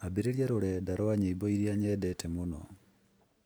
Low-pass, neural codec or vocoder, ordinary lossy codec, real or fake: none; none; none; real